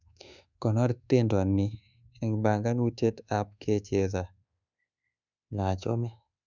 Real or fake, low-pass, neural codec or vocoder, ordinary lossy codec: fake; 7.2 kHz; codec, 24 kHz, 1.2 kbps, DualCodec; none